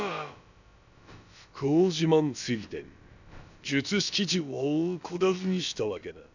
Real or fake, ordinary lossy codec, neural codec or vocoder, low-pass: fake; none; codec, 16 kHz, about 1 kbps, DyCAST, with the encoder's durations; 7.2 kHz